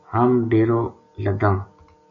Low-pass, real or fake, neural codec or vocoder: 7.2 kHz; real; none